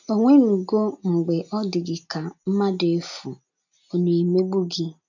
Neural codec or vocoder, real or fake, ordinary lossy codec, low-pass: none; real; none; 7.2 kHz